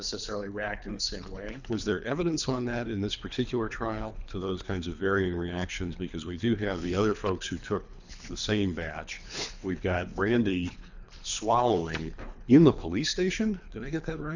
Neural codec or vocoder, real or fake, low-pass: codec, 24 kHz, 3 kbps, HILCodec; fake; 7.2 kHz